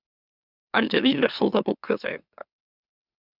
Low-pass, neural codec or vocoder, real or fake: 5.4 kHz; autoencoder, 44.1 kHz, a latent of 192 numbers a frame, MeloTTS; fake